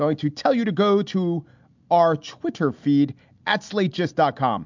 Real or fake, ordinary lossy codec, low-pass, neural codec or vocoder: real; MP3, 64 kbps; 7.2 kHz; none